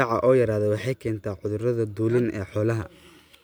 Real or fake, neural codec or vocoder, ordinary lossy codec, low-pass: real; none; none; none